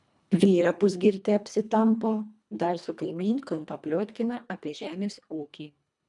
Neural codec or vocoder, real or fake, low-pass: codec, 24 kHz, 1.5 kbps, HILCodec; fake; 10.8 kHz